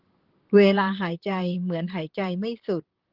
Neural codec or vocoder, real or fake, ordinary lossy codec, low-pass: vocoder, 44.1 kHz, 128 mel bands, Pupu-Vocoder; fake; Opus, 16 kbps; 5.4 kHz